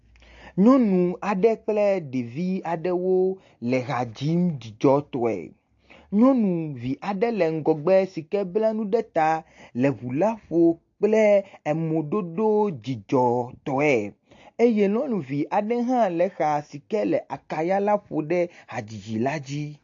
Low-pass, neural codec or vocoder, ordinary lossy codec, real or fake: 7.2 kHz; none; AAC, 48 kbps; real